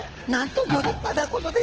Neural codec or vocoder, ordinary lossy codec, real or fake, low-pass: codec, 24 kHz, 6 kbps, HILCodec; Opus, 16 kbps; fake; 7.2 kHz